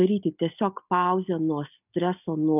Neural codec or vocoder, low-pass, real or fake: none; 3.6 kHz; real